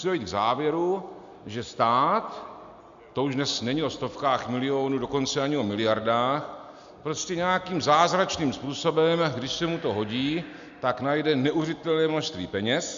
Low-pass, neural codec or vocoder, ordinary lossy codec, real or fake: 7.2 kHz; none; MP3, 64 kbps; real